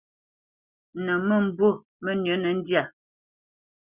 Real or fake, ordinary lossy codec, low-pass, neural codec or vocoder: real; Opus, 64 kbps; 3.6 kHz; none